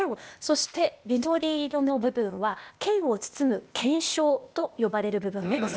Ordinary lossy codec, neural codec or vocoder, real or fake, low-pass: none; codec, 16 kHz, 0.8 kbps, ZipCodec; fake; none